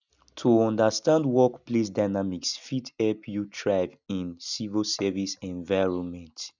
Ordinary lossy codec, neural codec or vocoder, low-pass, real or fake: none; none; 7.2 kHz; real